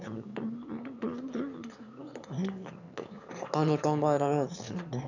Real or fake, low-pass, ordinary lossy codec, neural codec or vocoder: fake; 7.2 kHz; none; autoencoder, 22.05 kHz, a latent of 192 numbers a frame, VITS, trained on one speaker